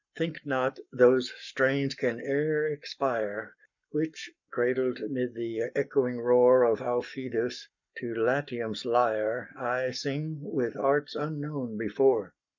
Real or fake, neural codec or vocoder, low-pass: fake; codec, 44.1 kHz, 7.8 kbps, Pupu-Codec; 7.2 kHz